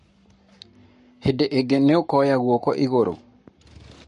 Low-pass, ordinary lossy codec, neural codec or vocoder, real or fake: 14.4 kHz; MP3, 48 kbps; codec, 44.1 kHz, 7.8 kbps, Pupu-Codec; fake